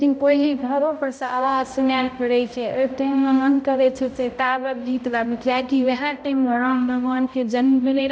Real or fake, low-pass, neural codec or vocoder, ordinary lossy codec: fake; none; codec, 16 kHz, 0.5 kbps, X-Codec, HuBERT features, trained on balanced general audio; none